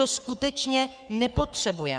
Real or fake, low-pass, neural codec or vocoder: fake; 9.9 kHz; codec, 44.1 kHz, 3.4 kbps, Pupu-Codec